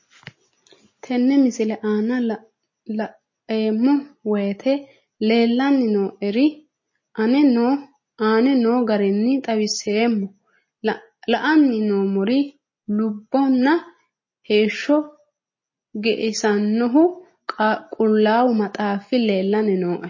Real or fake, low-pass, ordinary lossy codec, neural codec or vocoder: real; 7.2 kHz; MP3, 32 kbps; none